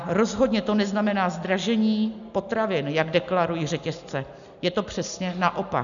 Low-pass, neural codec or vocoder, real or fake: 7.2 kHz; none; real